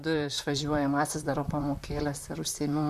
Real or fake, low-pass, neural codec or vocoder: fake; 14.4 kHz; vocoder, 44.1 kHz, 128 mel bands, Pupu-Vocoder